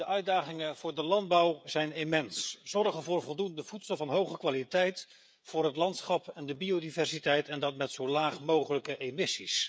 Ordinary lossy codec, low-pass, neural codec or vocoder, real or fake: none; none; codec, 16 kHz, 16 kbps, FreqCodec, smaller model; fake